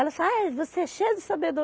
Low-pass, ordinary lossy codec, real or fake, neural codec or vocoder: none; none; real; none